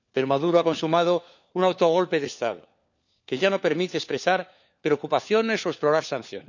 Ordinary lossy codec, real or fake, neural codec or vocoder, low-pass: none; fake; codec, 16 kHz, 4 kbps, FunCodec, trained on LibriTTS, 50 frames a second; 7.2 kHz